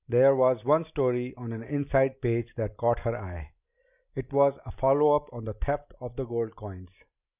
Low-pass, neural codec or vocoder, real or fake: 3.6 kHz; none; real